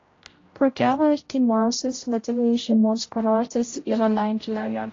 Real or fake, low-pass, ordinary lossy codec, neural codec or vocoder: fake; 7.2 kHz; AAC, 32 kbps; codec, 16 kHz, 0.5 kbps, X-Codec, HuBERT features, trained on general audio